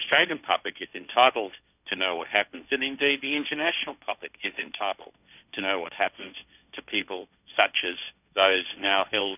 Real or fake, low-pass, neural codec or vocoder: fake; 3.6 kHz; codec, 16 kHz, 1.1 kbps, Voila-Tokenizer